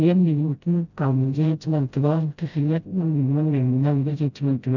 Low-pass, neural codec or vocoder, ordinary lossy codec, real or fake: 7.2 kHz; codec, 16 kHz, 0.5 kbps, FreqCodec, smaller model; none; fake